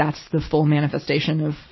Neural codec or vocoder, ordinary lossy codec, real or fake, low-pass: none; MP3, 24 kbps; real; 7.2 kHz